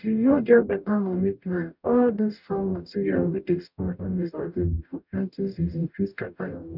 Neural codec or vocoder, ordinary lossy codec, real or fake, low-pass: codec, 44.1 kHz, 0.9 kbps, DAC; none; fake; 5.4 kHz